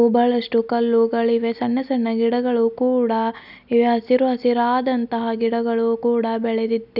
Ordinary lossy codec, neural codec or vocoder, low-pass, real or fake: none; none; 5.4 kHz; real